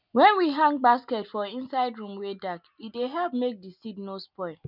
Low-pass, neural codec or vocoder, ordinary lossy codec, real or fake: 5.4 kHz; none; none; real